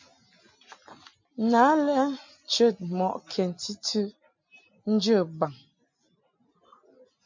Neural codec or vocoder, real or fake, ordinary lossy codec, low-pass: none; real; MP3, 64 kbps; 7.2 kHz